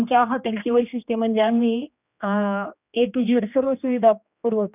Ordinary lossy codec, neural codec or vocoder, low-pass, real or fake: none; codec, 16 kHz, 2 kbps, X-Codec, HuBERT features, trained on general audio; 3.6 kHz; fake